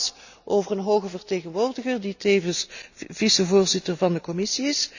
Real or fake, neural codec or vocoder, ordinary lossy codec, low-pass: real; none; none; 7.2 kHz